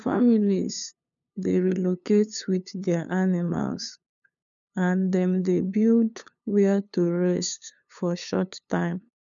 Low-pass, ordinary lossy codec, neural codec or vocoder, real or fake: 7.2 kHz; none; codec, 16 kHz, 2 kbps, FunCodec, trained on LibriTTS, 25 frames a second; fake